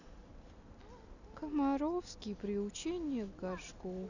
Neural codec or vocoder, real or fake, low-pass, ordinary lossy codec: none; real; 7.2 kHz; none